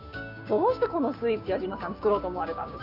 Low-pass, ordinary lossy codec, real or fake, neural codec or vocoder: 5.4 kHz; none; fake; codec, 16 kHz, 6 kbps, DAC